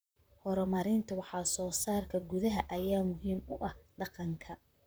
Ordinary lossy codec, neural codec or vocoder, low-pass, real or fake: none; vocoder, 44.1 kHz, 128 mel bands, Pupu-Vocoder; none; fake